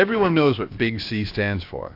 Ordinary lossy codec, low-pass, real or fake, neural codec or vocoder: AAC, 32 kbps; 5.4 kHz; fake; codec, 16 kHz, about 1 kbps, DyCAST, with the encoder's durations